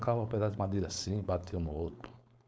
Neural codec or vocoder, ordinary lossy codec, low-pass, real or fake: codec, 16 kHz, 4.8 kbps, FACodec; none; none; fake